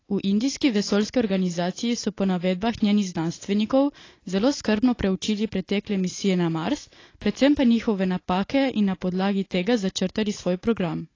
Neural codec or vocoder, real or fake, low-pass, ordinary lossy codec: none; real; 7.2 kHz; AAC, 32 kbps